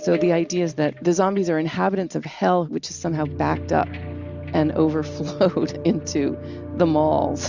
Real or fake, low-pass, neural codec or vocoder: real; 7.2 kHz; none